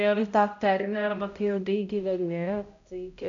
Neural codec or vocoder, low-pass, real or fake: codec, 16 kHz, 0.5 kbps, X-Codec, HuBERT features, trained on balanced general audio; 7.2 kHz; fake